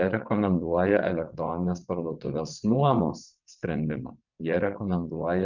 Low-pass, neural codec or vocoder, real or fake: 7.2 kHz; vocoder, 22.05 kHz, 80 mel bands, WaveNeXt; fake